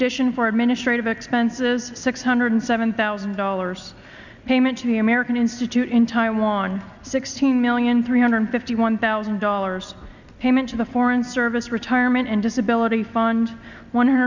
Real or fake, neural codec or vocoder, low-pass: real; none; 7.2 kHz